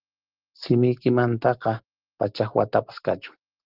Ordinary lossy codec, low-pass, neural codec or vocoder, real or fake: Opus, 16 kbps; 5.4 kHz; none; real